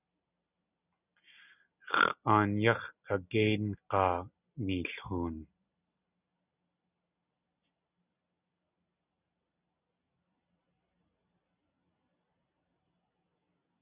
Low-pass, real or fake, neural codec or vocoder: 3.6 kHz; real; none